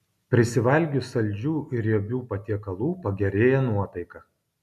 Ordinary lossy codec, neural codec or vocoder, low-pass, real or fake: MP3, 96 kbps; none; 14.4 kHz; real